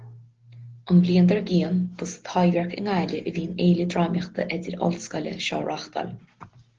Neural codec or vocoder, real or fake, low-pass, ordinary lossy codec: none; real; 7.2 kHz; Opus, 16 kbps